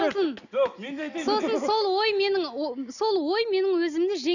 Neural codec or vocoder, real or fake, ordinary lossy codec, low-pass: none; real; none; 7.2 kHz